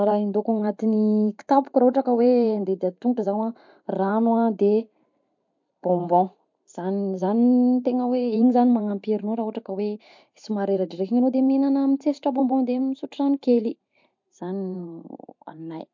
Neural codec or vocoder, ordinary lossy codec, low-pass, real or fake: vocoder, 44.1 kHz, 128 mel bands every 512 samples, BigVGAN v2; MP3, 48 kbps; 7.2 kHz; fake